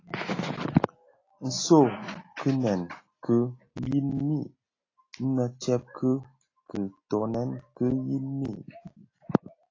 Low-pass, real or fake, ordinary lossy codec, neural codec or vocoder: 7.2 kHz; real; AAC, 32 kbps; none